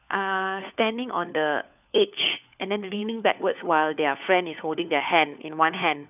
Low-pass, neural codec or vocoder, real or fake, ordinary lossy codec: 3.6 kHz; codec, 16 kHz, 4 kbps, FunCodec, trained on LibriTTS, 50 frames a second; fake; none